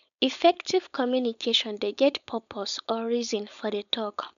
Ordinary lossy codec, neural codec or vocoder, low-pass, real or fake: none; codec, 16 kHz, 4.8 kbps, FACodec; 7.2 kHz; fake